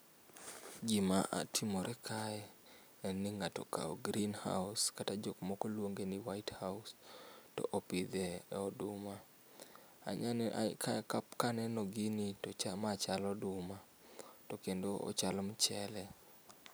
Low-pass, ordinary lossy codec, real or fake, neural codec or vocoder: none; none; real; none